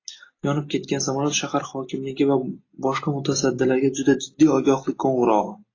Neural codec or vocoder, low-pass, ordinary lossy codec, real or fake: none; 7.2 kHz; AAC, 32 kbps; real